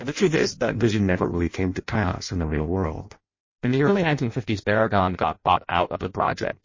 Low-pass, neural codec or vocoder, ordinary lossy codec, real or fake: 7.2 kHz; codec, 16 kHz in and 24 kHz out, 0.6 kbps, FireRedTTS-2 codec; MP3, 32 kbps; fake